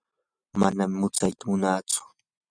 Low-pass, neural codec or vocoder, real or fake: 9.9 kHz; none; real